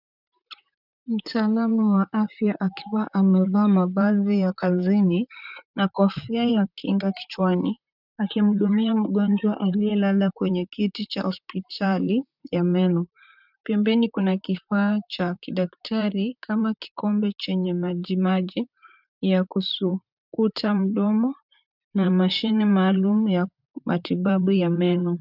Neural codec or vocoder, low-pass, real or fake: vocoder, 44.1 kHz, 128 mel bands, Pupu-Vocoder; 5.4 kHz; fake